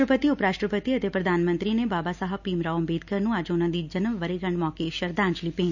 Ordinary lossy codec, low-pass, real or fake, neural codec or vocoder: none; 7.2 kHz; real; none